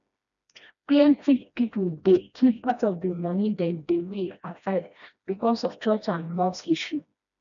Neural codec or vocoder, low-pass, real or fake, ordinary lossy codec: codec, 16 kHz, 1 kbps, FreqCodec, smaller model; 7.2 kHz; fake; none